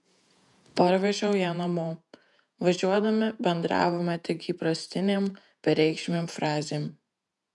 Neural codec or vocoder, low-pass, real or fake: vocoder, 48 kHz, 128 mel bands, Vocos; 10.8 kHz; fake